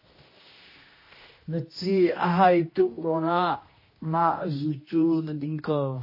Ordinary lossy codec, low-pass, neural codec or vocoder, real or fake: MP3, 24 kbps; 5.4 kHz; codec, 16 kHz, 1 kbps, X-Codec, HuBERT features, trained on general audio; fake